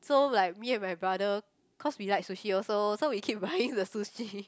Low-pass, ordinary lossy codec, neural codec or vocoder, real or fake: none; none; none; real